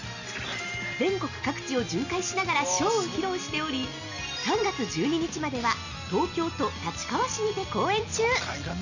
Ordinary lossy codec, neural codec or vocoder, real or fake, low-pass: none; none; real; 7.2 kHz